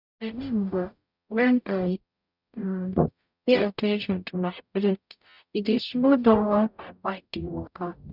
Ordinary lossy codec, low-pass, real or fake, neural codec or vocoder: none; 5.4 kHz; fake; codec, 44.1 kHz, 0.9 kbps, DAC